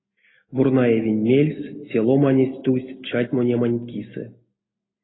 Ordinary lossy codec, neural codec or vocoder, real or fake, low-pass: AAC, 16 kbps; none; real; 7.2 kHz